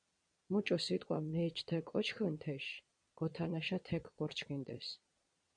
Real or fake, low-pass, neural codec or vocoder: fake; 9.9 kHz; vocoder, 22.05 kHz, 80 mel bands, Vocos